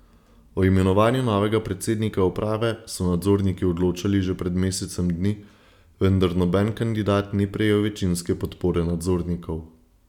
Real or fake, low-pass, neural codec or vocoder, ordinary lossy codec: real; 19.8 kHz; none; none